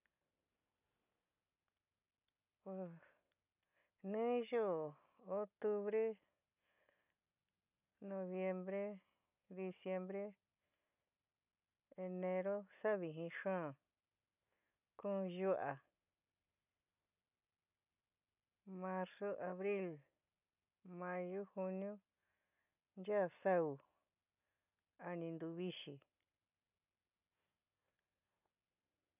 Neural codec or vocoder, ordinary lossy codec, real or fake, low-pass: none; none; real; 3.6 kHz